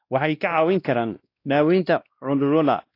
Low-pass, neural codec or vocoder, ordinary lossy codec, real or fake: 5.4 kHz; codec, 16 kHz, 1 kbps, X-Codec, WavLM features, trained on Multilingual LibriSpeech; AAC, 32 kbps; fake